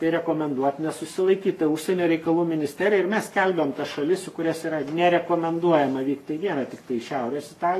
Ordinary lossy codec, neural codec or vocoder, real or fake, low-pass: AAC, 48 kbps; codec, 44.1 kHz, 7.8 kbps, Pupu-Codec; fake; 14.4 kHz